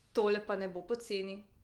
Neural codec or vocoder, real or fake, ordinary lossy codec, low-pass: autoencoder, 48 kHz, 128 numbers a frame, DAC-VAE, trained on Japanese speech; fake; Opus, 24 kbps; 19.8 kHz